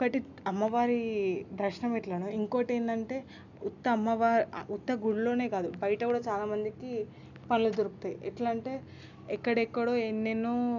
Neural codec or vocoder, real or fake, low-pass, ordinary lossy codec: none; real; 7.2 kHz; none